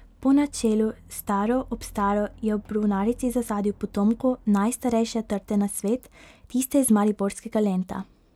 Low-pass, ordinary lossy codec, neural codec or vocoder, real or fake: 19.8 kHz; none; none; real